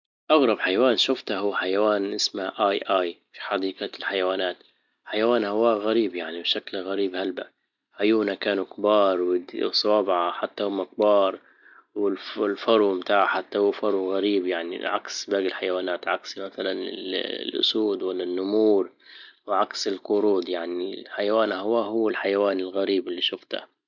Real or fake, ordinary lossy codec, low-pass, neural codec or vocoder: real; none; 7.2 kHz; none